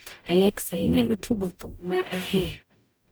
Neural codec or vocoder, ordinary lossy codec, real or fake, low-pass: codec, 44.1 kHz, 0.9 kbps, DAC; none; fake; none